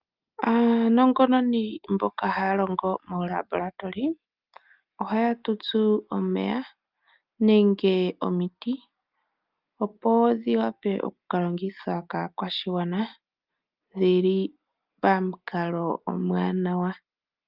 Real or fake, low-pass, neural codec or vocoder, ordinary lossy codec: real; 5.4 kHz; none; Opus, 24 kbps